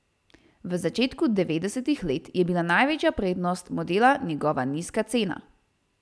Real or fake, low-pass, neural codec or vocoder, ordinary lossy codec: real; none; none; none